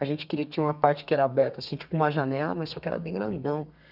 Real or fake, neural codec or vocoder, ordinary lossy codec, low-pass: fake; codec, 32 kHz, 1.9 kbps, SNAC; none; 5.4 kHz